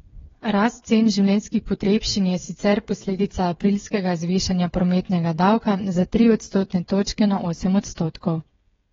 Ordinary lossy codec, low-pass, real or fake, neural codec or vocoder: AAC, 24 kbps; 7.2 kHz; fake; codec, 16 kHz, 8 kbps, FreqCodec, smaller model